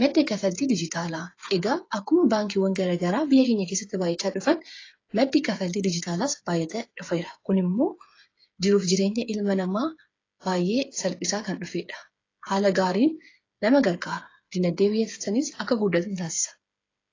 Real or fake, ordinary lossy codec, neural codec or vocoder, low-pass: fake; AAC, 32 kbps; codec, 16 kHz, 8 kbps, FreqCodec, smaller model; 7.2 kHz